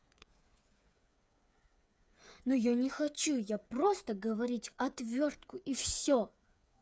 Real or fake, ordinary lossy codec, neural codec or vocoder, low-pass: fake; none; codec, 16 kHz, 8 kbps, FreqCodec, smaller model; none